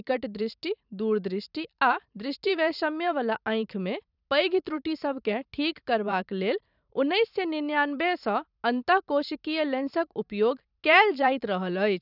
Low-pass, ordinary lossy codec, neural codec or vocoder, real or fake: 5.4 kHz; none; vocoder, 44.1 kHz, 128 mel bands every 512 samples, BigVGAN v2; fake